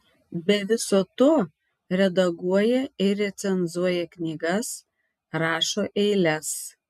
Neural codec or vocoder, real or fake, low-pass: none; real; 14.4 kHz